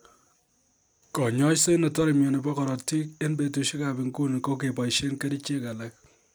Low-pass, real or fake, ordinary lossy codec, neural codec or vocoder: none; real; none; none